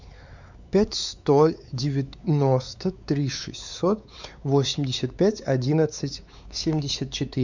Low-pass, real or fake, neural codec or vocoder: 7.2 kHz; fake; codec, 16 kHz, 4 kbps, X-Codec, WavLM features, trained on Multilingual LibriSpeech